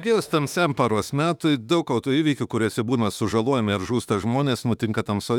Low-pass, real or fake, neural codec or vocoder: 19.8 kHz; fake; autoencoder, 48 kHz, 32 numbers a frame, DAC-VAE, trained on Japanese speech